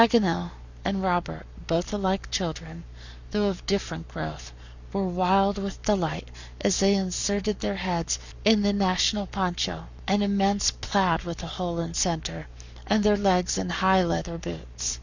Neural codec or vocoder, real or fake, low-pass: codec, 44.1 kHz, 7.8 kbps, Pupu-Codec; fake; 7.2 kHz